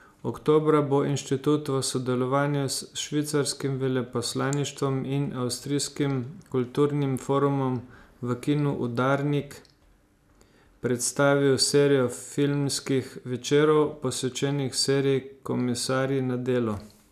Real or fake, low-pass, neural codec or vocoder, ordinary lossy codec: real; 14.4 kHz; none; none